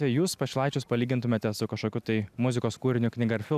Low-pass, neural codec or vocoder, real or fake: 14.4 kHz; none; real